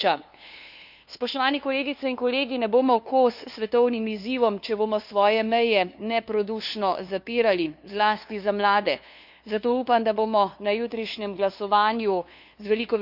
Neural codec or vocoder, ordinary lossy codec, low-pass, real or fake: codec, 16 kHz, 2 kbps, FunCodec, trained on LibriTTS, 25 frames a second; none; 5.4 kHz; fake